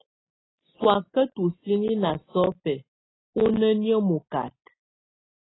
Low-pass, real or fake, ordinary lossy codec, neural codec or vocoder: 7.2 kHz; real; AAC, 16 kbps; none